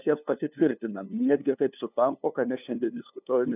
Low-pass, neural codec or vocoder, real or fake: 3.6 kHz; codec, 16 kHz, 2 kbps, FunCodec, trained on LibriTTS, 25 frames a second; fake